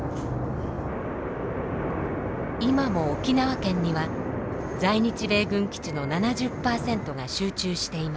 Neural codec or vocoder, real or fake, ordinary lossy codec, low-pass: none; real; none; none